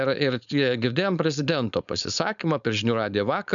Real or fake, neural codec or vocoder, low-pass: fake; codec, 16 kHz, 4.8 kbps, FACodec; 7.2 kHz